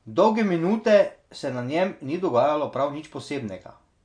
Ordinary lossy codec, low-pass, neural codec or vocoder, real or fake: MP3, 48 kbps; 9.9 kHz; none; real